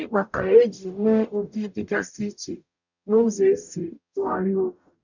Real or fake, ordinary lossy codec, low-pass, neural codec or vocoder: fake; none; 7.2 kHz; codec, 44.1 kHz, 0.9 kbps, DAC